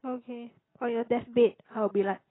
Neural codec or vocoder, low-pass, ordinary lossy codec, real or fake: none; 7.2 kHz; AAC, 16 kbps; real